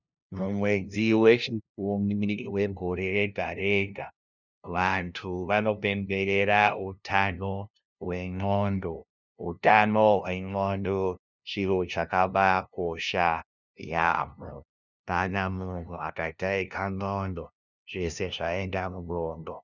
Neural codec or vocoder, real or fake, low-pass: codec, 16 kHz, 0.5 kbps, FunCodec, trained on LibriTTS, 25 frames a second; fake; 7.2 kHz